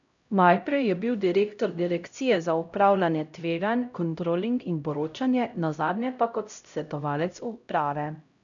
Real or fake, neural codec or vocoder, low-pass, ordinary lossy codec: fake; codec, 16 kHz, 0.5 kbps, X-Codec, HuBERT features, trained on LibriSpeech; 7.2 kHz; none